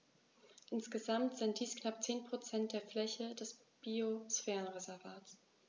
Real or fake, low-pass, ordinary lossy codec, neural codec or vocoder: real; none; none; none